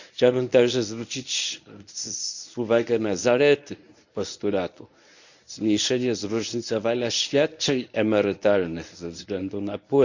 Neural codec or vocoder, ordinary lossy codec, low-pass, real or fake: codec, 24 kHz, 0.9 kbps, WavTokenizer, medium speech release version 1; none; 7.2 kHz; fake